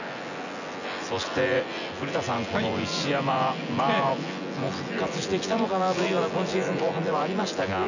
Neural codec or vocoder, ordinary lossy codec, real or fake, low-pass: vocoder, 24 kHz, 100 mel bands, Vocos; none; fake; 7.2 kHz